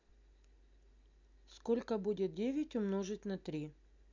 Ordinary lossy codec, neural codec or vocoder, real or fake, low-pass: none; none; real; 7.2 kHz